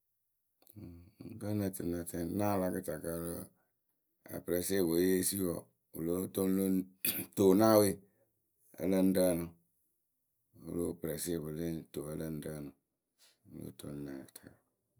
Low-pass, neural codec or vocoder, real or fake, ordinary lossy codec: none; none; real; none